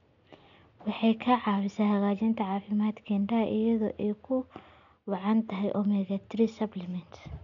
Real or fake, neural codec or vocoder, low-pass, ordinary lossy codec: real; none; 7.2 kHz; none